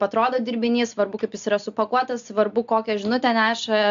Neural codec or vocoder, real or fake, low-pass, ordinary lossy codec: none; real; 7.2 kHz; AAC, 64 kbps